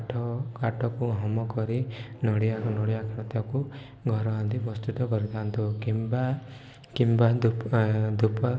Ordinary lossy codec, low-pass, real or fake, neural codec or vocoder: none; none; real; none